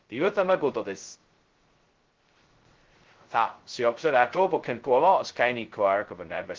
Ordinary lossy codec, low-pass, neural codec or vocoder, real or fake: Opus, 16 kbps; 7.2 kHz; codec, 16 kHz, 0.2 kbps, FocalCodec; fake